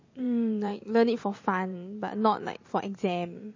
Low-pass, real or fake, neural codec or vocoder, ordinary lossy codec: 7.2 kHz; fake; vocoder, 44.1 kHz, 128 mel bands every 512 samples, BigVGAN v2; MP3, 32 kbps